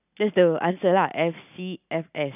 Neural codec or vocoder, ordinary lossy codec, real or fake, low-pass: none; none; real; 3.6 kHz